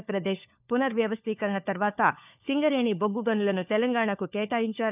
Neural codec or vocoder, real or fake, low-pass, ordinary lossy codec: codec, 16 kHz, 4 kbps, FunCodec, trained on LibriTTS, 50 frames a second; fake; 3.6 kHz; none